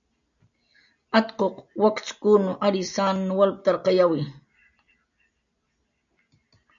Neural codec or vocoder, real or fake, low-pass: none; real; 7.2 kHz